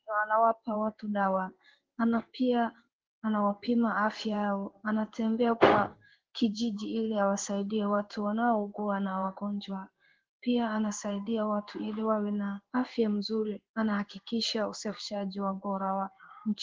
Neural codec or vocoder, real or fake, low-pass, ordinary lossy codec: codec, 16 kHz in and 24 kHz out, 1 kbps, XY-Tokenizer; fake; 7.2 kHz; Opus, 16 kbps